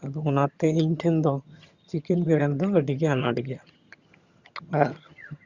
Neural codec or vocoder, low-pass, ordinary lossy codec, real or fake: vocoder, 22.05 kHz, 80 mel bands, HiFi-GAN; 7.2 kHz; Opus, 64 kbps; fake